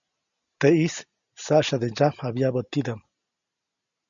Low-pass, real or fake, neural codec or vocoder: 7.2 kHz; real; none